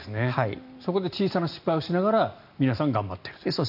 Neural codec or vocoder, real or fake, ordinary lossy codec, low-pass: none; real; none; 5.4 kHz